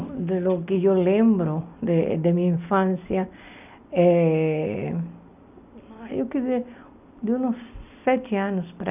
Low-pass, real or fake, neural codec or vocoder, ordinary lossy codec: 3.6 kHz; real; none; none